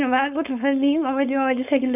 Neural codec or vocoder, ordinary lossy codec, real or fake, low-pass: codec, 16 kHz, 4.8 kbps, FACodec; none; fake; 3.6 kHz